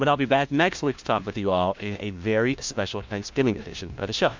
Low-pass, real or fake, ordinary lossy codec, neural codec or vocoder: 7.2 kHz; fake; MP3, 64 kbps; codec, 16 kHz, 1 kbps, FunCodec, trained on LibriTTS, 50 frames a second